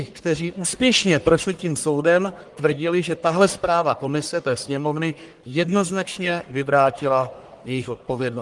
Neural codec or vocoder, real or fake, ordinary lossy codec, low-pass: codec, 44.1 kHz, 1.7 kbps, Pupu-Codec; fake; Opus, 24 kbps; 10.8 kHz